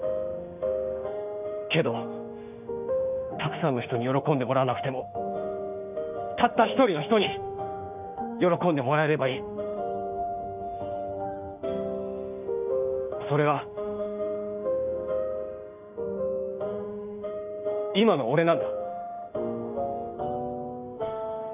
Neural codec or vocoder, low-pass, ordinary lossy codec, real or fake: autoencoder, 48 kHz, 32 numbers a frame, DAC-VAE, trained on Japanese speech; 3.6 kHz; none; fake